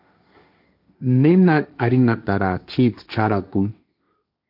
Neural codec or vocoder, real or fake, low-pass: codec, 16 kHz, 1.1 kbps, Voila-Tokenizer; fake; 5.4 kHz